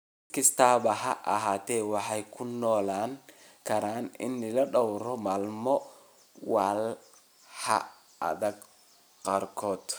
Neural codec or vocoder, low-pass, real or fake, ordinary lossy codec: none; none; real; none